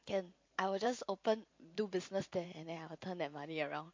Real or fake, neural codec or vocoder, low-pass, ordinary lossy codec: fake; vocoder, 44.1 kHz, 128 mel bands every 512 samples, BigVGAN v2; 7.2 kHz; MP3, 48 kbps